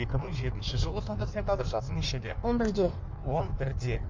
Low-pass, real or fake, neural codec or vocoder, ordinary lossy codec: 7.2 kHz; fake; codec, 16 kHz in and 24 kHz out, 1.1 kbps, FireRedTTS-2 codec; MP3, 64 kbps